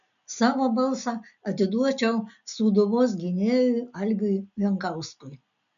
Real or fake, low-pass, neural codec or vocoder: real; 7.2 kHz; none